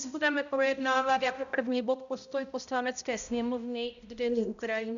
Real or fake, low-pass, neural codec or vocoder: fake; 7.2 kHz; codec, 16 kHz, 0.5 kbps, X-Codec, HuBERT features, trained on balanced general audio